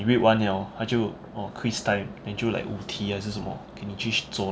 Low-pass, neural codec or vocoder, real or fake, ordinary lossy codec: none; none; real; none